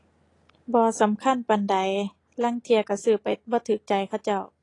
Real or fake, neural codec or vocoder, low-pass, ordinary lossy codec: real; none; 10.8 kHz; AAC, 32 kbps